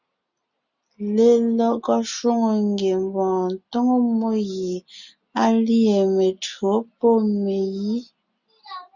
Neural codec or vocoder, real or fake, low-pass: none; real; 7.2 kHz